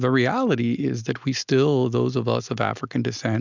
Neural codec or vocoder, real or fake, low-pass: none; real; 7.2 kHz